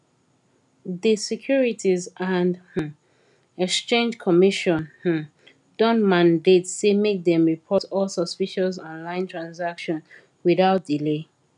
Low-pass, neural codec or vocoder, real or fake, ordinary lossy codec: 10.8 kHz; none; real; none